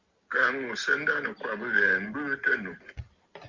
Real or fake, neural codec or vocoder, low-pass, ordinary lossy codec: real; none; 7.2 kHz; Opus, 24 kbps